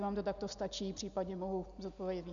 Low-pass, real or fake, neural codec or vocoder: 7.2 kHz; real; none